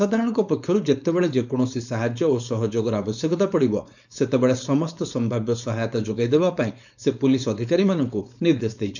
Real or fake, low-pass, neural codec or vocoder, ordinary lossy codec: fake; 7.2 kHz; codec, 16 kHz, 4.8 kbps, FACodec; none